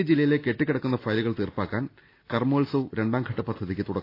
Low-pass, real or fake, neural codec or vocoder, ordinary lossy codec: 5.4 kHz; real; none; AAC, 32 kbps